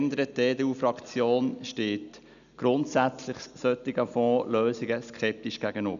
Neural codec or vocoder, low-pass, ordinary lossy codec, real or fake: none; 7.2 kHz; none; real